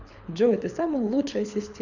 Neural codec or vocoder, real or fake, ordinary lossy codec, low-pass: codec, 24 kHz, 6 kbps, HILCodec; fake; none; 7.2 kHz